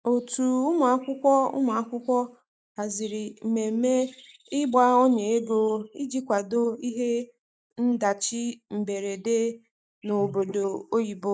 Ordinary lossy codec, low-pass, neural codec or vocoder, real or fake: none; none; none; real